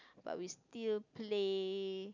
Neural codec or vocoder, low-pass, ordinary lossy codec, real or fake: none; 7.2 kHz; none; real